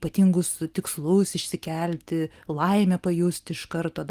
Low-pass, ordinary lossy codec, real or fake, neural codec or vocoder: 14.4 kHz; Opus, 24 kbps; real; none